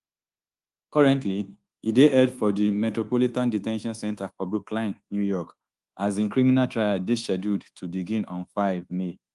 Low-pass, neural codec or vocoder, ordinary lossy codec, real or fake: 10.8 kHz; codec, 24 kHz, 1.2 kbps, DualCodec; Opus, 24 kbps; fake